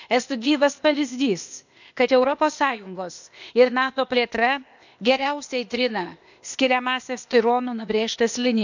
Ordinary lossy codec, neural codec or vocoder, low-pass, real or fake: none; codec, 16 kHz, 0.8 kbps, ZipCodec; 7.2 kHz; fake